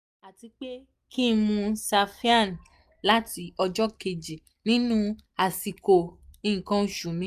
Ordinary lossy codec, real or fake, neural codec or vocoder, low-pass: none; real; none; 14.4 kHz